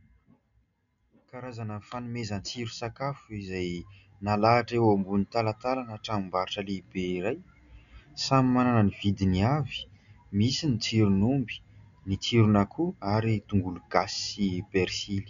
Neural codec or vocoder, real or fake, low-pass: none; real; 7.2 kHz